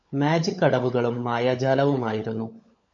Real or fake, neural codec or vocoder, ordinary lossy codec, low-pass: fake; codec, 16 kHz, 16 kbps, FunCodec, trained on LibriTTS, 50 frames a second; MP3, 48 kbps; 7.2 kHz